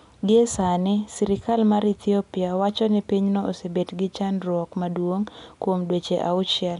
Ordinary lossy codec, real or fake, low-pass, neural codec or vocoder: none; real; 10.8 kHz; none